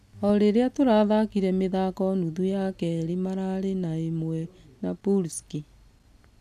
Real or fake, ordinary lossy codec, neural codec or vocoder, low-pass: real; none; none; 14.4 kHz